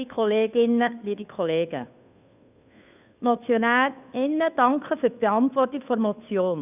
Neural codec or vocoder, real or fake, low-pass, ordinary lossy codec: codec, 16 kHz, 2 kbps, FunCodec, trained on Chinese and English, 25 frames a second; fake; 3.6 kHz; none